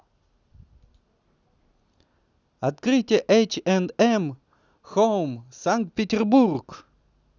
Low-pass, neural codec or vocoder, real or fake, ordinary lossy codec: 7.2 kHz; none; real; none